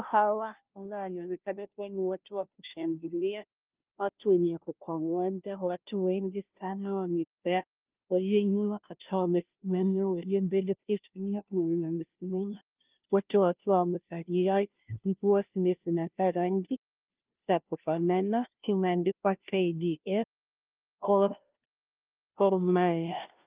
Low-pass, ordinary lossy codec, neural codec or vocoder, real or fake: 3.6 kHz; Opus, 24 kbps; codec, 16 kHz, 0.5 kbps, FunCodec, trained on Chinese and English, 25 frames a second; fake